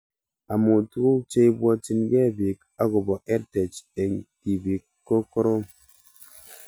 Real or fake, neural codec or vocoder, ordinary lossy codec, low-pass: real; none; none; none